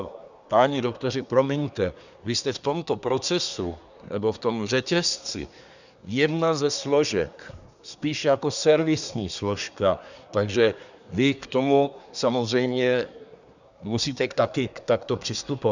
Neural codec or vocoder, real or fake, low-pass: codec, 24 kHz, 1 kbps, SNAC; fake; 7.2 kHz